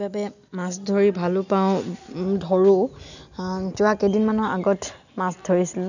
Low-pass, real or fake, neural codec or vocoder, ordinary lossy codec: 7.2 kHz; real; none; none